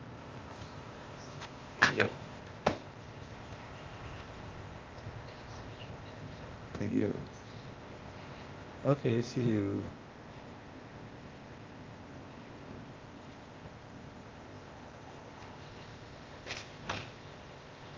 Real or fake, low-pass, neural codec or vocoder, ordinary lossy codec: fake; 7.2 kHz; codec, 16 kHz, 0.8 kbps, ZipCodec; Opus, 32 kbps